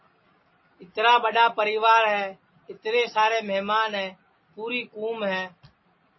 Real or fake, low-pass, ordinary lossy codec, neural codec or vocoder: real; 7.2 kHz; MP3, 24 kbps; none